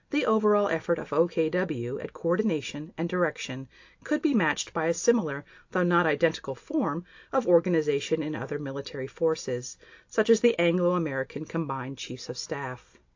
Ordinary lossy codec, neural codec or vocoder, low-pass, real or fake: AAC, 48 kbps; none; 7.2 kHz; real